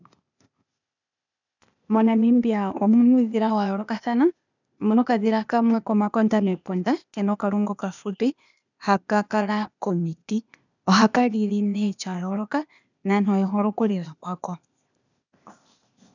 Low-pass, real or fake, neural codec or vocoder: 7.2 kHz; fake; codec, 16 kHz, 0.8 kbps, ZipCodec